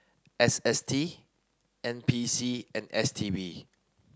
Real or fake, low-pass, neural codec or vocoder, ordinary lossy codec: real; none; none; none